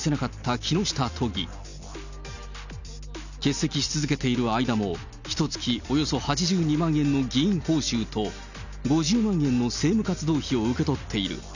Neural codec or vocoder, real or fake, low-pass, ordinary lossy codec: none; real; 7.2 kHz; none